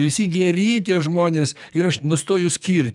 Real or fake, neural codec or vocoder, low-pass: fake; codec, 44.1 kHz, 2.6 kbps, SNAC; 10.8 kHz